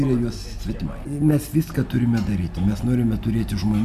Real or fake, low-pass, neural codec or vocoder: real; 14.4 kHz; none